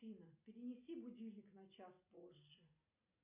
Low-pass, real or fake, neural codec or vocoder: 3.6 kHz; real; none